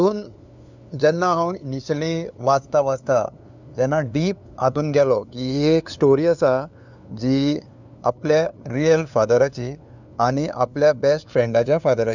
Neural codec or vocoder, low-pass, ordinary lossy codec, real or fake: codec, 16 kHz, 2 kbps, FunCodec, trained on LibriTTS, 25 frames a second; 7.2 kHz; none; fake